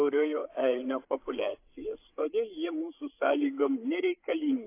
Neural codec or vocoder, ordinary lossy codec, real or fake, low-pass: codec, 16 kHz, 8 kbps, FreqCodec, larger model; AAC, 24 kbps; fake; 3.6 kHz